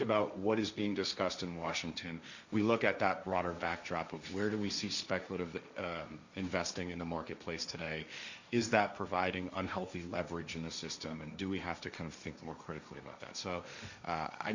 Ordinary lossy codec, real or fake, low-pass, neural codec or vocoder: Opus, 64 kbps; fake; 7.2 kHz; codec, 16 kHz, 1.1 kbps, Voila-Tokenizer